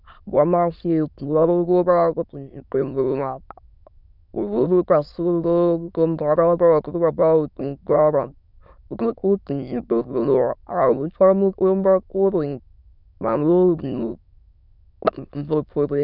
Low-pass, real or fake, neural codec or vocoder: 5.4 kHz; fake; autoencoder, 22.05 kHz, a latent of 192 numbers a frame, VITS, trained on many speakers